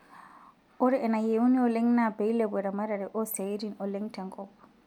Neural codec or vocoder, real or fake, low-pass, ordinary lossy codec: none; real; 19.8 kHz; none